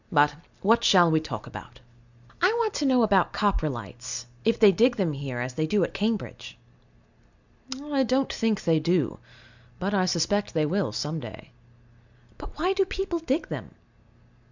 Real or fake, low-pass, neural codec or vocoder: real; 7.2 kHz; none